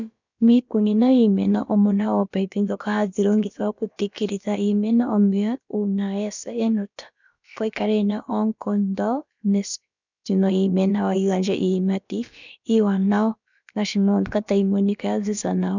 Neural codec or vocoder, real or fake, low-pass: codec, 16 kHz, about 1 kbps, DyCAST, with the encoder's durations; fake; 7.2 kHz